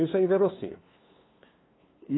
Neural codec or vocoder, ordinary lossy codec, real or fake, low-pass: codec, 16 kHz, 2 kbps, FunCodec, trained on LibriTTS, 25 frames a second; AAC, 16 kbps; fake; 7.2 kHz